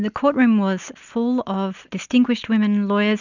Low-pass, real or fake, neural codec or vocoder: 7.2 kHz; real; none